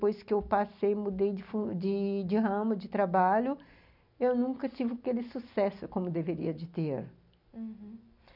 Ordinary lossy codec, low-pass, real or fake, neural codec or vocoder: none; 5.4 kHz; real; none